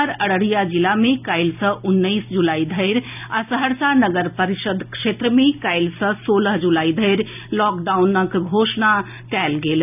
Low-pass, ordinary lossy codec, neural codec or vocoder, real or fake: 3.6 kHz; none; none; real